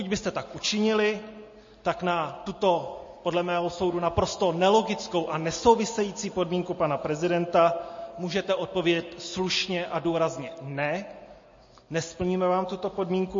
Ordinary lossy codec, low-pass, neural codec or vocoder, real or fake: MP3, 32 kbps; 7.2 kHz; none; real